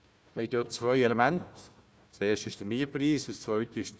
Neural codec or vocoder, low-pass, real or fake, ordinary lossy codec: codec, 16 kHz, 1 kbps, FunCodec, trained on Chinese and English, 50 frames a second; none; fake; none